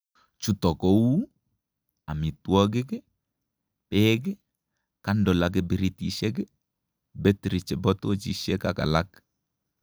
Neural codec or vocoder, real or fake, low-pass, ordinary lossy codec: none; real; none; none